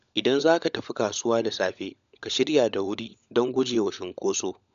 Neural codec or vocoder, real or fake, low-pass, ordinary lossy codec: codec, 16 kHz, 16 kbps, FunCodec, trained on LibriTTS, 50 frames a second; fake; 7.2 kHz; MP3, 96 kbps